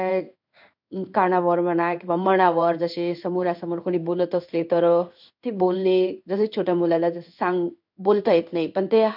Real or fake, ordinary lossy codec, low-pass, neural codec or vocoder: fake; MP3, 48 kbps; 5.4 kHz; codec, 16 kHz in and 24 kHz out, 1 kbps, XY-Tokenizer